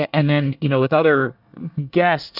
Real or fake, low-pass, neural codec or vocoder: fake; 5.4 kHz; codec, 24 kHz, 1 kbps, SNAC